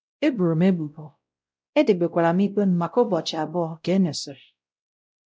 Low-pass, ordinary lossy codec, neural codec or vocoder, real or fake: none; none; codec, 16 kHz, 0.5 kbps, X-Codec, WavLM features, trained on Multilingual LibriSpeech; fake